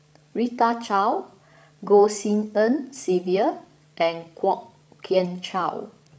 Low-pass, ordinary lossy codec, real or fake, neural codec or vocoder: none; none; fake; codec, 16 kHz, 16 kbps, FreqCodec, larger model